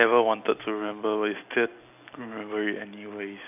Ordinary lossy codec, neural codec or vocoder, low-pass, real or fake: none; none; 3.6 kHz; real